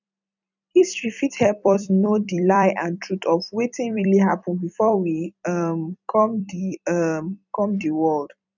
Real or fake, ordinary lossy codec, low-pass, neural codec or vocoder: fake; none; 7.2 kHz; vocoder, 44.1 kHz, 128 mel bands every 256 samples, BigVGAN v2